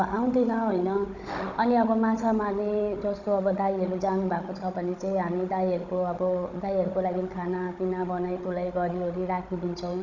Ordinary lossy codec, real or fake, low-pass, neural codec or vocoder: none; fake; 7.2 kHz; codec, 16 kHz, 16 kbps, FunCodec, trained on Chinese and English, 50 frames a second